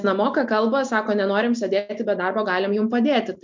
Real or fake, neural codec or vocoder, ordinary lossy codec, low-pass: real; none; MP3, 64 kbps; 7.2 kHz